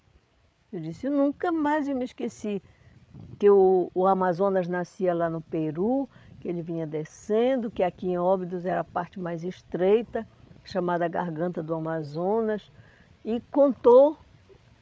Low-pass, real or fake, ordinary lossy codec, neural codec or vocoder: none; fake; none; codec, 16 kHz, 8 kbps, FreqCodec, larger model